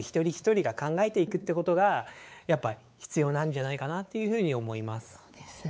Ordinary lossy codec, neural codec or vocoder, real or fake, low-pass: none; codec, 16 kHz, 4 kbps, X-Codec, WavLM features, trained on Multilingual LibriSpeech; fake; none